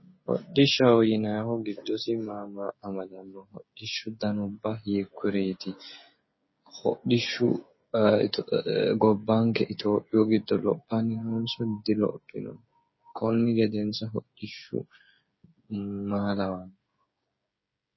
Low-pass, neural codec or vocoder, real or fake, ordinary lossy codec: 7.2 kHz; codec, 16 kHz, 16 kbps, FreqCodec, smaller model; fake; MP3, 24 kbps